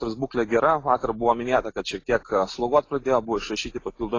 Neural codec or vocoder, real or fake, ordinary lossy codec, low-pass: none; real; AAC, 32 kbps; 7.2 kHz